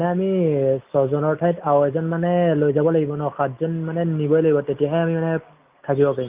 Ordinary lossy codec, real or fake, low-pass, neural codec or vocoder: Opus, 24 kbps; real; 3.6 kHz; none